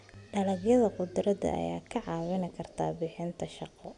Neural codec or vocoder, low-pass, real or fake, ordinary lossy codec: none; 10.8 kHz; real; none